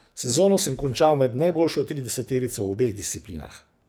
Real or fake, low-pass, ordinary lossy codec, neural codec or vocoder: fake; none; none; codec, 44.1 kHz, 2.6 kbps, SNAC